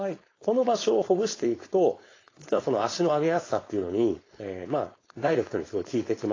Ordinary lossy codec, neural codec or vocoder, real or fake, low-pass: AAC, 32 kbps; codec, 16 kHz, 4.8 kbps, FACodec; fake; 7.2 kHz